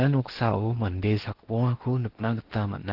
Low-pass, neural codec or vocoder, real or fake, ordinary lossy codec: 5.4 kHz; codec, 16 kHz in and 24 kHz out, 0.8 kbps, FocalCodec, streaming, 65536 codes; fake; Opus, 16 kbps